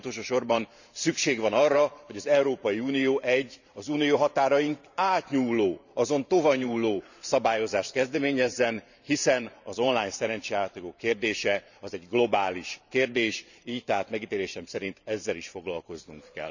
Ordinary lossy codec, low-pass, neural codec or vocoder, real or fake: none; 7.2 kHz; vocoder, 44.1 kHz, 128 mel bands every 512 samples, BigVGAN v2; fake